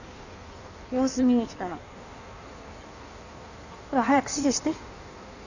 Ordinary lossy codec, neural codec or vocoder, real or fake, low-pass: none; codec, 16 kHz in and 24 kHz out, 1.1 kbps, FireRedTTS-2 codec; fake; 7.2 kHz